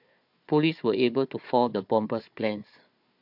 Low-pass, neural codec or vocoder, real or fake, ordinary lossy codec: 5.4 kHz; codec, 16 kHz, 4 kbps, FunCodec, trained on Chinese and English, 50 frames a second; fake; MP3, 48 kbps